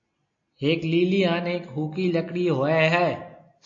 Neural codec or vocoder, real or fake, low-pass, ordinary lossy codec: none; real; 7.2 kHz; AAC, 48 kbps